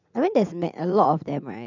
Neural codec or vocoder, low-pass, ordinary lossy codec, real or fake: vocoder, 44.1 kHz, 80 mel bands, Vocos; 7.2 kHz; none; fake